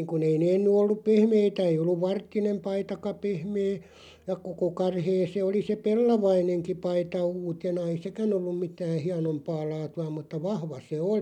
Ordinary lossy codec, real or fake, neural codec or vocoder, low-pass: none; real; none; 19.8 kHz